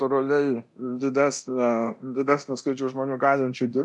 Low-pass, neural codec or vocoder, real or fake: 10.8 kHz; codec, 24 kHz, 0.9 kbps, DualCodec; fake